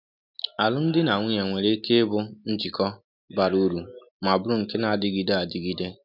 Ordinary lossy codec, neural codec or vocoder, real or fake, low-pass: none; none; real; 5.4 kHz